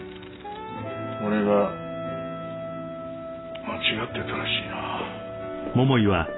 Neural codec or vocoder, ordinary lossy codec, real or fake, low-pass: none; AAC, 16 kbps; real; 7.2 kHz